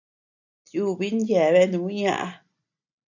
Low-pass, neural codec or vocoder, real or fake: 7.2 kHz; none; real